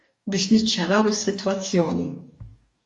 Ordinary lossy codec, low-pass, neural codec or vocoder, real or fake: MP3, 48 kbps; 10.8 kHz; codec, 44.1 kHz, 2.6 kbps, DAC; fake